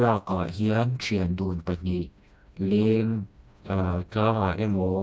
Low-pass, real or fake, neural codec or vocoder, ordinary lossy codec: none; fake; codec, 16 kHz, 1 kbps, FreqCodec, smaller model; none